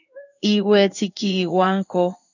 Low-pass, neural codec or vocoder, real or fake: 7.2 kHz; codec, 16 kHz in and 24 kHz out, 1 kbps, XY-Tokenizer; fake